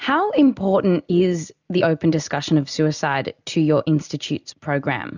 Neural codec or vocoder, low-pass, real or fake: none; 7.2 kHz; real